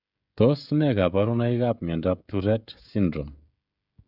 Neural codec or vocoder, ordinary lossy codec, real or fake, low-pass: codec, 16 kHz, 16 kbps, FreqCodec, smaller model; none; fake; 5.4 kHz